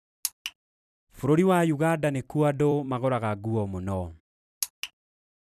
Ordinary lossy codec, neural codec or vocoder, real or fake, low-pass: none; vocoder, 44.1 kHz, 128 mel bands every 256 samples, BigVGAN v2; fake; 14.4 kHz